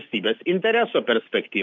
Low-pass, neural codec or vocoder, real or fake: 7.2 kHz; none; real